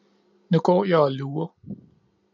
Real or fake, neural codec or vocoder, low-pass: real; none; 7.2 kHz